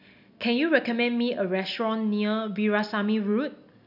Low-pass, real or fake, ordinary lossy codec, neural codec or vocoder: 5.4 kHz; real; none; none